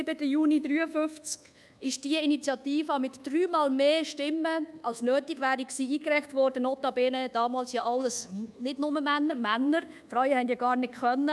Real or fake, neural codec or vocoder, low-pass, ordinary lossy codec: fake; codec, 24 kHz, 1.2 kbps, DualCodec; none; none